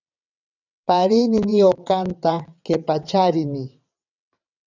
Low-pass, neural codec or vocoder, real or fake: 7.2 kHz; vocoder, 22.05 kHz, 80 mel bands, WaveNeXt; fake